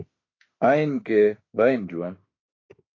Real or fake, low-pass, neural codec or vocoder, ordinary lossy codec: fake; 7.2 kHz; autoencoder, 48 kHz, 32 numbers a frame, DAC-VAE, trained on Japanese speech; AAC, 32 kbps